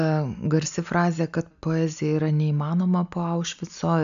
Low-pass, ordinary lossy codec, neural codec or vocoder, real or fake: 7.2 kHz; AAC, 96 kbps; none; real